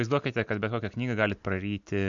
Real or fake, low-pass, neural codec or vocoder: real; 7.2 kHz; none